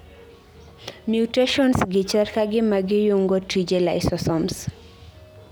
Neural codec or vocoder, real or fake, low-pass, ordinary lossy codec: none; real; none; none